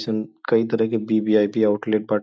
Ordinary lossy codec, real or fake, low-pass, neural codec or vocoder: none; real; none; none